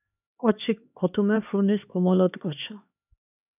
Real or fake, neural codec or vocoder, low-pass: fake; codec, 16 kHz, 2 kbps, X-Codec, HuBERT features, trained on LibriSpeech; 3.6 kHz